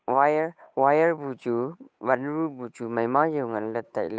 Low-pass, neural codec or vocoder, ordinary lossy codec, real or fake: none; codec, 16 kHz, 8 kbps, FunCodec, trained on Chinese and English, 25 frames a second; none; fake